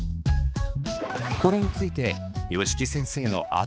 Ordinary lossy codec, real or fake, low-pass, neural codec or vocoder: none; fake; none; codec, 16 kHz, 2 kbps, X-Codec, HuBERT features, trained on balanced general audio